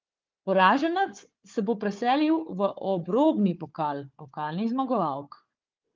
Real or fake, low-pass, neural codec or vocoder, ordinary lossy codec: fake; 7.2 kHz; codec, 16 kHz, 4 kbps, FunCodec, trained on Chinese and English, 50 frames a second; Opus, 32 kbps